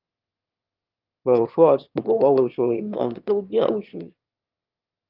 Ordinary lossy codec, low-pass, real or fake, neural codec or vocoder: Opus, 24 kbps; 5.4 kHz; fake; autoencoder, 22.05 kHz, a latent of 192 numbers a frame, VITS, trained on one speaker